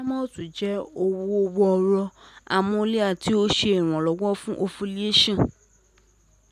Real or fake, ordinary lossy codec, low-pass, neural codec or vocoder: real; none; 14.4 kHz; none